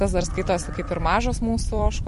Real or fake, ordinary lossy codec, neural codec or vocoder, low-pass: fake; MP3, 48 kbps; vocoder, 44.1 kHz, 128 mel bands every 256 samples, BigVGAN v2; 14.4 kHz